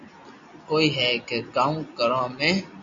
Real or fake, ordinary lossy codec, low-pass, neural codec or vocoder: real; AAC, 64 kbps; 7.2 kHz; none